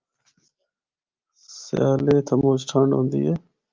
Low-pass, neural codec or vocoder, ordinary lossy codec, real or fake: 7.2 kHz; none; Opus, 32 kbps; real